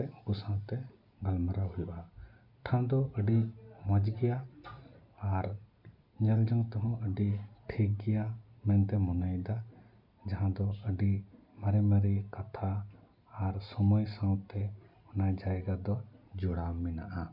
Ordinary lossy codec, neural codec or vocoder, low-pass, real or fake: none; none; 5.4 kHz; real